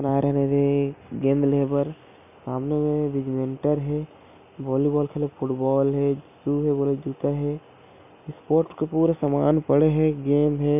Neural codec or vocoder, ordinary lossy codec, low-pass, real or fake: none; none; 3.6 kHz; real